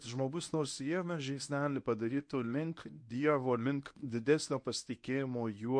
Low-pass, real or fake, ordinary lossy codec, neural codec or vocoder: 9.9 kHz; fake; MP3, 48 kbps; codec, 24 kHz, 0.9 kbps, WavTokenizer, medium speech release version 1